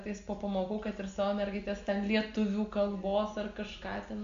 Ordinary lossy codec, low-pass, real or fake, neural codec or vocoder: MP3, 64 kbps; 7.2 kHz; real; none